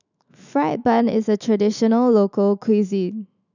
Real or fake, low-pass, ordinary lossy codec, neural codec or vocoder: fake; 7.2 kHz; none; autoencoder, 48 kHz, 128 numbers a frame, DAC-VAE, trained on Japanese speech